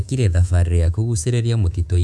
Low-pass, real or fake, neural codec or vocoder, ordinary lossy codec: none; fake; codec, 24 kHz, 3.1 kbps, DualCodec; none